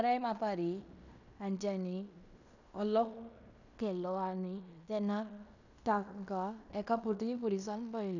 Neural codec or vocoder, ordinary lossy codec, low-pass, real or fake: codec, 16 kHz in and 24 kHz out, 0.9 kbps, LongCat-Audio-Codec, fine tuned four codebook decoder; none; 7.2 kHz; fake